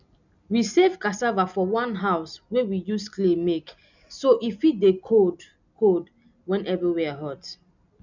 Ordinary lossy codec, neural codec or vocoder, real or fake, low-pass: none; none; real; 7.2 kHz